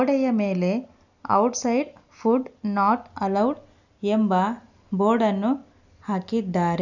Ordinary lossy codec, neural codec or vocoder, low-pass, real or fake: none; none; 7.2 kHz; real